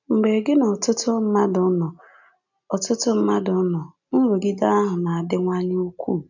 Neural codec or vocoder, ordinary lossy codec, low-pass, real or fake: none; none; 7.2 kHz; real